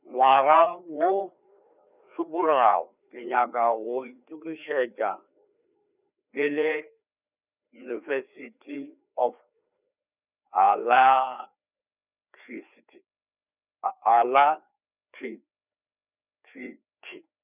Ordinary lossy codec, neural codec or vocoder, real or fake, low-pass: none; codec, 16 kHz, 2 kbps, FreqCodec, larger model; fake; 3.6 kHz